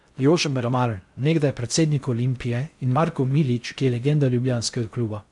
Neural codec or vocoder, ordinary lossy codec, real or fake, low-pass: codec, 16 kHz in and 24 kHz out, 0.6 kbps, FocalCodec, streaming, 4096 codes; none; fake; 10.8 kHz